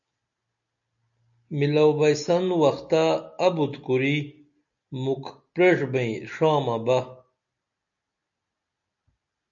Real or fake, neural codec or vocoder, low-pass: real; none; 7.2 kHz